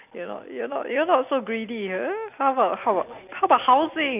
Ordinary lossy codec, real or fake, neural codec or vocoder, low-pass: none; real; none; 3.6 kHz